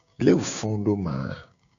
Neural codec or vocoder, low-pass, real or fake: codec, 16 kHz, 6 kbps, DAC; 7.2 kHz; fake